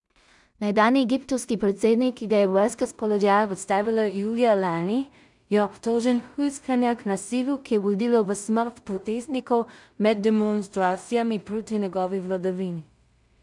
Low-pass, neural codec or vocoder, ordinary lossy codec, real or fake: 10.8 kHz; codec, 16 kHz in and 24 kHz out, 0.4 kbps, LongCat-Audio-Codec, two codebook decoder; none; fake